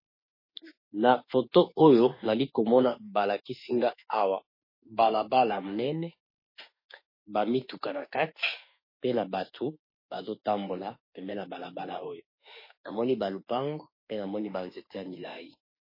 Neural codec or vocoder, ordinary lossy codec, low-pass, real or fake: autoencoder, 48 kHz, 32 numbers a frame, DAC-VAE, trained on Japanese speech; MP3, 24 kbps; 5.4 kHz; fake